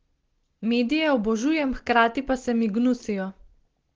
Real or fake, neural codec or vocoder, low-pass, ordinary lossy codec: real; none; 7.2 kHz; Opus, 16 kbps